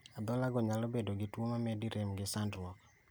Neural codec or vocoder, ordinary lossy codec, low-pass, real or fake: none; none; none; real